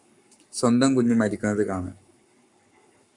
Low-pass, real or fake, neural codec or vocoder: 10.8 kHz; fake; codec, 44.1 kHz, 7.8 kbps, Pupu-Codec